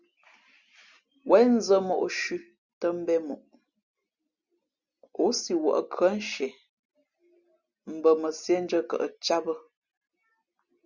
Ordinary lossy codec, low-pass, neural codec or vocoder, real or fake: Opus, 64 kbps; 7.2 kHz; none; real